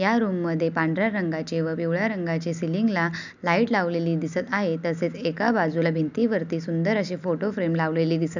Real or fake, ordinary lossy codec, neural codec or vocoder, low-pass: real; none; none; 7.2 kHz